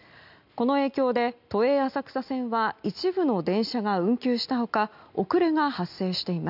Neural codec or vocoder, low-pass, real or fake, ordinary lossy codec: none; 5.4 kHz; real; none